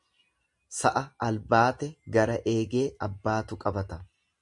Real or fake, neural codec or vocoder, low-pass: real; none; 10.8 kHz